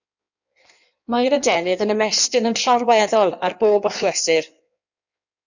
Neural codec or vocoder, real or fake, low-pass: codec, 16 kHz in and 24 kHz out, 1.1 kbps, FireRedTTS-2 codec; fake; 7.2 kHz